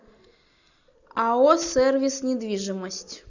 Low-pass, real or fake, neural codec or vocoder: 7.2 kHz; real; none